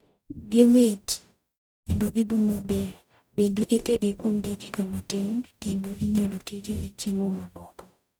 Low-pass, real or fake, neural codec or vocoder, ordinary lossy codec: none; fake; codec, 44.1 kHz, 0.9 kbps, DAC; none